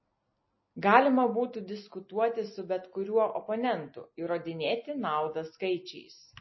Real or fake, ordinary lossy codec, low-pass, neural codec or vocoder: real; MP3, 24 kbps; 7.2 kHz; none